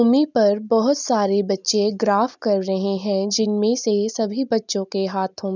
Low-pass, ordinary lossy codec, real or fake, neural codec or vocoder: 7.2 kHz; none; real; none